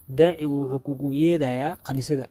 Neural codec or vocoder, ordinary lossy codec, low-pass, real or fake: codec, 32 kHz, 1.9 kbps, SNAC; Opus, 32 kbps; 14.4 kHz; fake